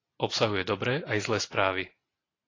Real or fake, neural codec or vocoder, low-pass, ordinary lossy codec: real; none; 7.2 kHz; AAC, 32 kbps